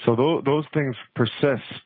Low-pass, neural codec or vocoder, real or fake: 5.4 kHz; none; real